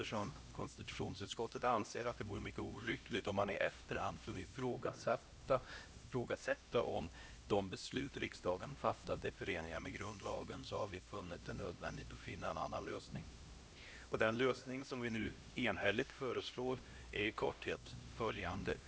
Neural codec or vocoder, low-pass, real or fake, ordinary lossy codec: codec, 16 kHz, 1 kbps, X-Codec, HuBERT features, trained on LibriSpeech; none; fake; none